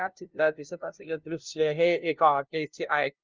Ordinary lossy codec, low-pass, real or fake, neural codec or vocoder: Opus, 24 kbps; 7.2 kHz; fake; codec, 16 kHz, 0.5 kbps, FunCodec, trained on LibriTTS, 25 frames a second